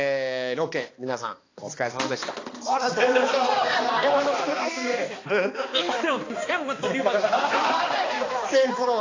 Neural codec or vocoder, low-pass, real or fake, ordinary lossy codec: codec, 16 kHz, 2 kbps, X-Codec, HuBERT features, trained on balanced general audio; 7.2 kHz; fake; AAC, 32 kbps